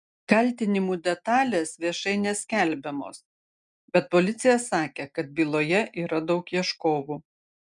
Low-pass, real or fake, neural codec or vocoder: 10.8 kHz; real; none